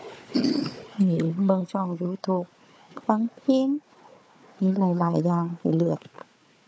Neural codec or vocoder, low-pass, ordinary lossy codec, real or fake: codec, 16 kHz, 4 kbps, FunCodec, trained on Chinese and English, 50 frames a second; none; none; fake